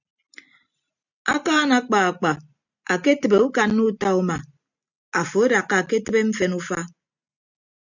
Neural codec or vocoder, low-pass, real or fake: none; 7.2 kHz; real